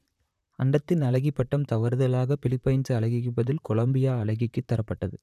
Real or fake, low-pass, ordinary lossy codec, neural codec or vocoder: fake; 14.4 kHz; none; vocoder, 44.1 kHz, 128 mel bands, Pupu-Vocoder